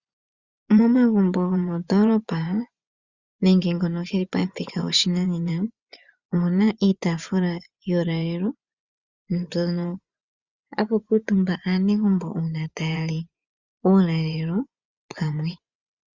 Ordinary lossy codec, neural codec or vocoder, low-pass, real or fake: Opus, 64 kbps; vocoder, 24 kHz, 100 mel bands, Vocos; 7.2 kHz; fake